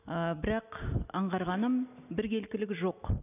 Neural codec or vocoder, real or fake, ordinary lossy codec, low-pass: none; real; none; 3.6 kHz